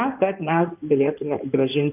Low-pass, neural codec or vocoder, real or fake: 3.6 kHz; codec, 16 kHz in and 24 kHz out, 2.2 kbps, FireRedTTS-2 codec; fake